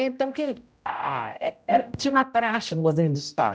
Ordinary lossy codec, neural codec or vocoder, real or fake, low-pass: none; codec, 16 kHz, 0.5 kbps, X-Codec, HuBERT features, trained on general audio; fake; none